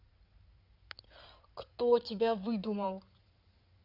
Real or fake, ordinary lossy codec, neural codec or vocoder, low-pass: fake; none; codec, 16 kHz in and 24 kHz out, 2.2 kbps, FireRedTTS-2 codec; 5.4 kHz